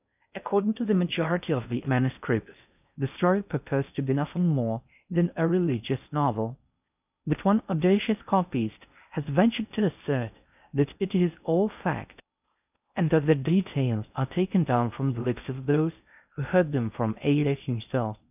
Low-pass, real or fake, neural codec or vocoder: 3.6 kHz; fake; codec, 16 kHz in and 24 kHz out, 0.6 kbps, FocalCodec, streaming, 4096 codes